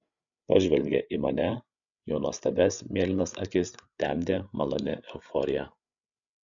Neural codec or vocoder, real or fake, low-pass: codec, 16 kHz, 8 kbps, FreqCodec, larger model; fake; 7.2 kHz